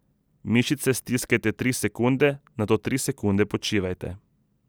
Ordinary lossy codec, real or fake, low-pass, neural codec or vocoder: none; real; none; none